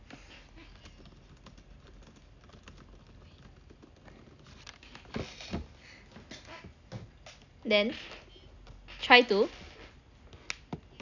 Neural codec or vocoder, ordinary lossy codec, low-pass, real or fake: none; none; 7.2 kHz; real